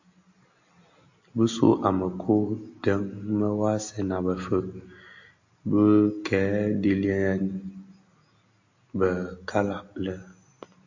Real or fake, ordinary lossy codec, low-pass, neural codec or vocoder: real; AAC, 48 kbps; 7.2 kHz; none